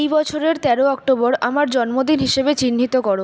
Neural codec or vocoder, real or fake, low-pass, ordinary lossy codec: none; real; none; none